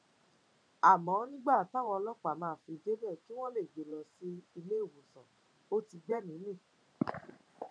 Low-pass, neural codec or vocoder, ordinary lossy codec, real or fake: 9.9 kHz; vocoder, 44.1 kHz, 128 mel bands every 512 samples, BigVGAN v2; none; fake